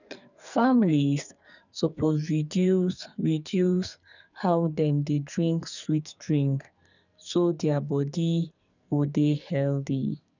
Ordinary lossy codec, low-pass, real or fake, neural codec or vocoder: none; 7.2 kHz; fake; codec, 44.1 kHz, 2.6 kbps, SNAC